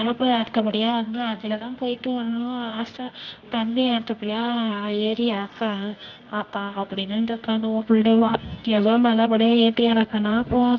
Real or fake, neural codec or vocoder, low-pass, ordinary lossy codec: fake; codec, 24 kHz, 0.9 kbps, WavTokenizer, medium music audio release; 7.2 kHz; none